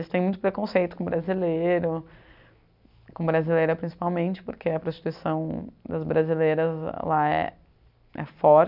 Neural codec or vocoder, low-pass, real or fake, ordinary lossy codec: none; 5.4 kHz; real; none